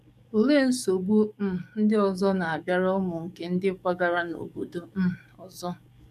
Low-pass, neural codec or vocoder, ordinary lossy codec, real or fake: 14.4 kHz; codec, 44.1 kHz, 7.8 kbps, DAC; none; fake